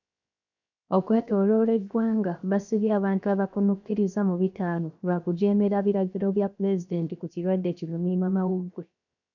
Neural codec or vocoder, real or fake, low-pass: codec, 16 kHz, 0.7 kbps, FocalCodec; fake; 7.2 kHz